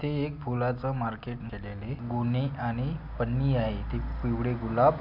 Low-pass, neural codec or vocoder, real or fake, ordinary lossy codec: 5.4 kHz; none; real; none